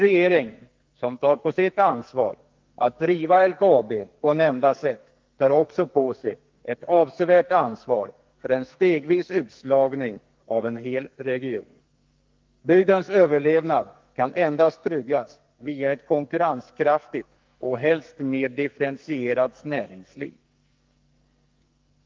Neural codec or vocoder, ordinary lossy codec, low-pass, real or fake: codec, 44.1 kHz, 2.6 kbps, SNAC; Opus, 32 kbps; 7.2 kHz; fake